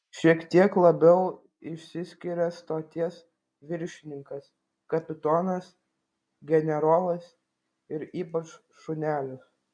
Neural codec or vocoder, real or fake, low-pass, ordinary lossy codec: none; real; 9.9 kHz; MP3, 96 kbps